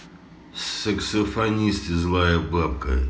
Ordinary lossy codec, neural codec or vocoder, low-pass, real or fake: none; none; none; real